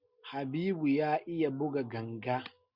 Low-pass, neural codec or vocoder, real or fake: 5.4 kHz; none; real